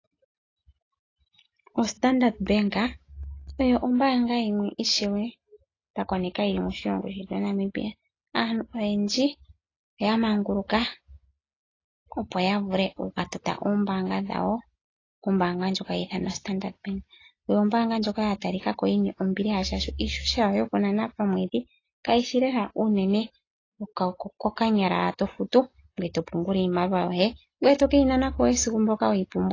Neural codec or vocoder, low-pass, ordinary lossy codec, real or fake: none; 7.2 kHz; AAC, 32 kbps; real